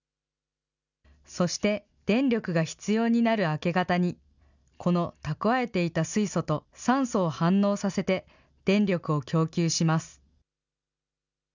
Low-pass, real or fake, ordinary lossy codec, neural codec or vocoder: 7.2 kHz; real; none; none